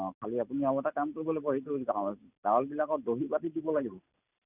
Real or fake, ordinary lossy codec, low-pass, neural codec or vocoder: real; none; 3.6 kHz; none